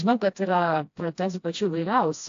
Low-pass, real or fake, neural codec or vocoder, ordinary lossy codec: 7.2 kHz; fake; codec, 16 kHz, 1 kbps, FreqCodec, smaller model; AAC, 48 kbps